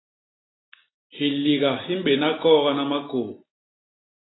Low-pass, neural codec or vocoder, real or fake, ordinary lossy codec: 7.2 kHz; none; real; AAC, 16 kbps